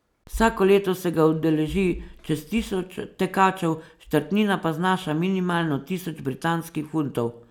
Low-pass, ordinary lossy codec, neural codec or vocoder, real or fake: 19.8 kHz; none; none; real